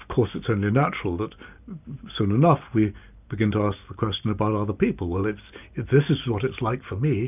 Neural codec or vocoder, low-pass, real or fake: none; 3.6 kHz; real